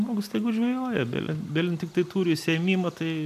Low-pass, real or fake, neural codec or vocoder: 14.4 kHz; real; none